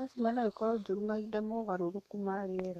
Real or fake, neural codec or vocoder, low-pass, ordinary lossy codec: fake; codec, 32 kHz, 1.9 kbps, SNAC; 14.4 kHz; none